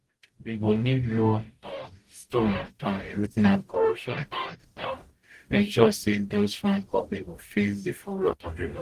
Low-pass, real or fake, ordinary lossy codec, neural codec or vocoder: 14.4 kHz; fake; Opus, 24 kbps; codec, 44.1 kHz, 0.9 kbps, DAC